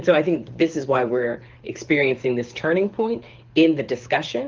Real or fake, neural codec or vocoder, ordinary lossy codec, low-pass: fake; codec, 24 kHz, 6 kbps, HILCodec; Opus, 24 kbps; 7.2 kHz